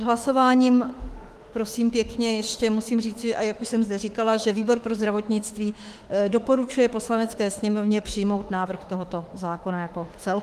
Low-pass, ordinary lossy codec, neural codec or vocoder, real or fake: 14.4 kHz; Opus, 24 kbps; autoencoder, 48 kHz, 32 numbers a frame, DAC-VAE, trained on Japanese speech; fake